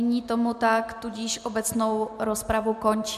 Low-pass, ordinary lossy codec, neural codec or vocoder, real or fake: 14.4 kHz; MP3, 96 kbps; none; real